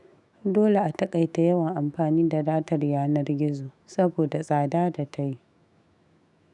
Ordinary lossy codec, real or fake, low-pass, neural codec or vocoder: none; fake; 10.8 kHz; autoencoder, 48 kHz, 128 numbers a frame, DAC-VAE, trained on Japanese speech